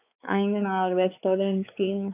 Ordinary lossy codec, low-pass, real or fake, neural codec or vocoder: AAC, 24 kbps; 3.6 kHz; fake; codec, 16 kHz, 4 kbps, X-Codec, WavLM features, trained on Multilingual LibriSpeech